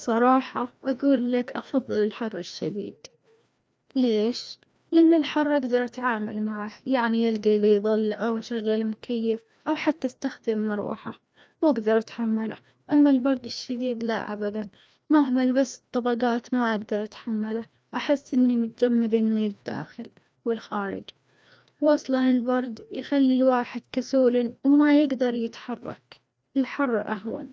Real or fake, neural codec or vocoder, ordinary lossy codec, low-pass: fake; codec, 16 kHz, 1 kbps, FreqCodec, larger model; none; none